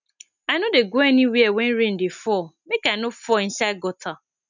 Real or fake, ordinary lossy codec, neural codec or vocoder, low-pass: real; none; none; 7.2 kHz